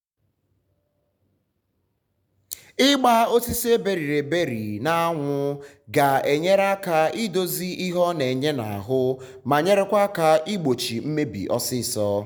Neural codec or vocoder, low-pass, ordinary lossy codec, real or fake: none; none; none; real